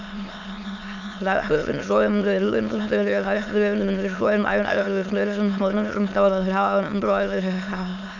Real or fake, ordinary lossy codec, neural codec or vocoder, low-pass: fake; none; autoencoder, 22.05 kHz, a latent of 192 numbers a frame, VITS, trained on many speakers; 7.2 kHz